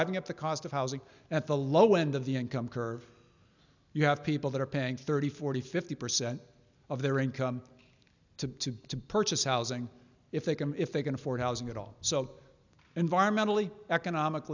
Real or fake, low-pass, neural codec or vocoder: real; 7.2 kHz; none